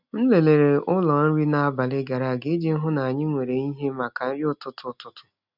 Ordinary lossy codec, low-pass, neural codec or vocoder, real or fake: none; 5.4 kHz; none; real